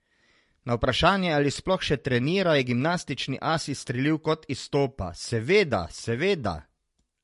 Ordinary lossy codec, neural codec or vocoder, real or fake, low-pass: MP3, 48 kbps; none; real; 14.4 kHz